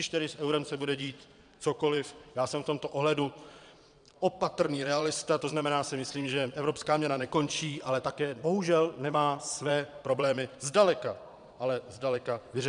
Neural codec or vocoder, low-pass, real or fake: vocoder, 22.05 kHz, 80 mel bands, Vocos; 9.9 kHz; fake